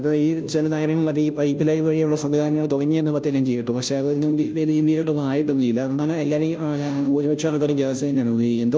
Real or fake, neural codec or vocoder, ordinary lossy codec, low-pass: fake; codec, 16 kHz, 0.5 kbps, FunCodec, trained on Chinese and English, 25 frames a second; none; none